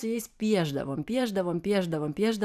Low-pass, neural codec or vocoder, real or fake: 14.4 kHz; vocoder, 44.1 kHz, 128 mel bands every 512 samples, BigVGAN v2; fake